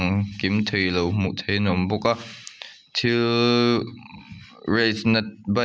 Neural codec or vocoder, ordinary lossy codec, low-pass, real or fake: none; none; none; real